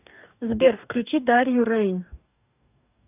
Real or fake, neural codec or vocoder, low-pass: fake; codec, 44.1 kHz, 2.6 kbps, DAC; 3.6 kHz